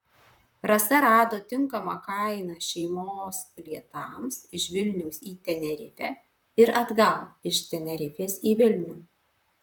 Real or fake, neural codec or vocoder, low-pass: fake; vocoder, 44.1 kHz, 128 mel bands, Pupu-Vocoder; 19.8 kHz